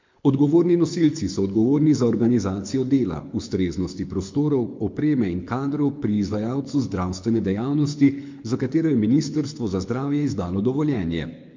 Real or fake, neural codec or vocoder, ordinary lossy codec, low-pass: fake; codec, 24 kHz, 6 kbps, HILCodec; MP3, 48 kbps; 7.2 kHz